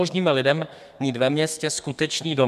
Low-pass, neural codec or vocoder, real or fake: 14.4 kHz; codec, 32 kHz, 1.9 kbps, SNAC; fake